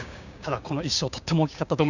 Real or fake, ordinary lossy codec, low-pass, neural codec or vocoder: fake; none; 7.2 kHz; codec, 16 kHz, 6 kbps, DAC